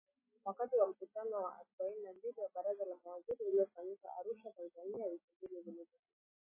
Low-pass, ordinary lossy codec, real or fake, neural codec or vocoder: 3.6 kHz; MP3, 16 kbps; real; none